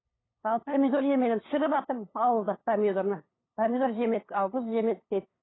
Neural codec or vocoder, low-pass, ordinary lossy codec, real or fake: codec, 16 kHz, 8 kbps, FunCodec, trained on LibriTTS, 25 frames a second; 7.2 kHz; AAC, 16 kbps; fake